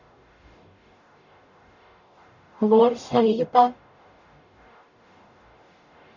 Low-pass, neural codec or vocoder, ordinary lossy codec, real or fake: 7.2 kHz; codec, 44.1 kHz, 0.9 kbps, DAC; none; fake